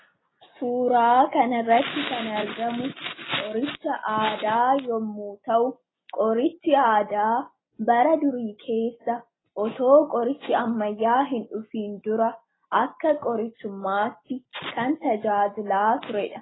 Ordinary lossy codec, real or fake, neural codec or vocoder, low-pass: AAC, 16 kbps; real; none; 7.2 kHz